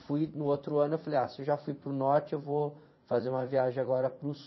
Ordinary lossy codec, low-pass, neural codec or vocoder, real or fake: MP3, 24 kbps; 7.2 kHz; vocoder, 44.1 kHz, 80 mel bands, Vocos; fake